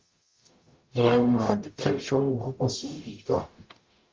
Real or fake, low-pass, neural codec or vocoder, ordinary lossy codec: fake; 7.2 kHz; codec, 44.1 kHz, 0.9 kbps, DAC; Opus, 24 kbps